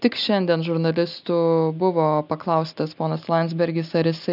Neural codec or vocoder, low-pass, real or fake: none; 5.4 kHz; real